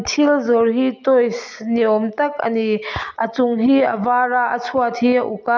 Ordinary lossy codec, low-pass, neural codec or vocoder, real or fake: none; 7.2 kHz; none; real